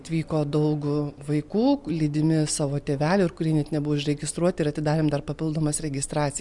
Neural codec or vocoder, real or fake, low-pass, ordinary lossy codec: none; real; 10.8 kHz; Opus, 64 kbps